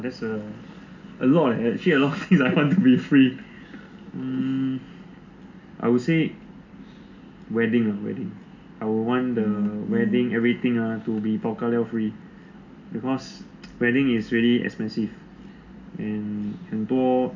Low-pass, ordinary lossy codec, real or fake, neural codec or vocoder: 7.2 kHz; AAC, 48 kbps; real; none